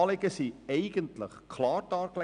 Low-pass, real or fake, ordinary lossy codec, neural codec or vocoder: 9.9 kHz; real; none; none